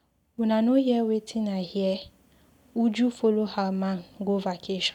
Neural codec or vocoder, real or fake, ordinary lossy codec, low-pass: none; real; none; 19.8 kHz